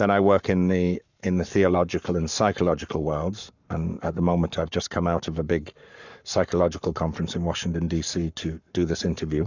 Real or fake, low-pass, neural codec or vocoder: fake; 7.2 kHz; codec, 44.1 kHz, 7.8 kbps, Pupu-Codec